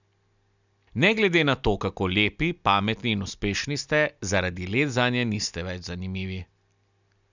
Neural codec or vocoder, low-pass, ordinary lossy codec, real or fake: none; 7.2 kHz; none; real